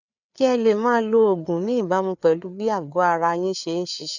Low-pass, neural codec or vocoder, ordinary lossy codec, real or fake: 7.2 kHz; codec, 16 kHz, 4 kbps, FreqCodec, larger model; none; fake